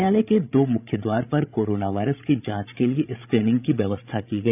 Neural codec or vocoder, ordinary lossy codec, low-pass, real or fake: codec, 16 kHz, 16 kbps, FreqCodec, larger model; none; 3.6 kHz; fake